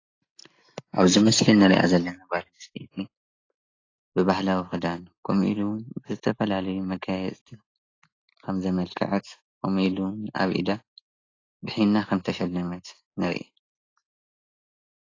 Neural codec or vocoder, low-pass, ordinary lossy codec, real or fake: none; 7.2 kHz; AAC, 32 kbps; real